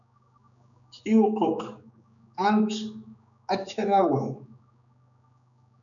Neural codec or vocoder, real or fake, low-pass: codec, 16 kHz, 4 kbps, X-Codec, HuBERT features, trained on general audio; fake; 7.2 kHz